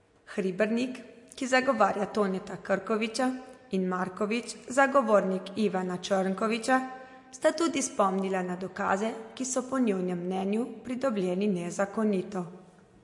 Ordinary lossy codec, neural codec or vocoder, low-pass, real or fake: MP3, 48 kbps; none; 10.8 kHz; real